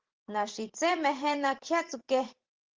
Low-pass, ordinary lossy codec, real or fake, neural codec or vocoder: 7.2 kHz; Opus, 16 kbps; fake; vocoder, 44.1 kHz, 128 mel bands every 512 samples, BigVGAN v2